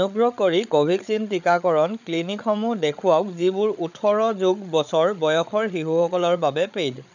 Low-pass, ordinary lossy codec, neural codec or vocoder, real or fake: 7.2 kHz; none; codec, 16 kHz, 8 kbps, FreqCodec, larger model; fake